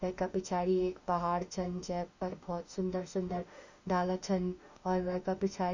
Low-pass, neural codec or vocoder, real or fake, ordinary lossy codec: 7.2 kHz; autoencoder, 48 kHz, 32 numbers a frame, DAC-VAE, trained on Japanese speech; fake; none